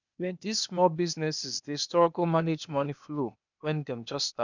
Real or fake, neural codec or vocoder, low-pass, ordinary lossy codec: fake; codec, 16 kHz, 0.8 kbps, ZipCodec; 7.2 kHz; none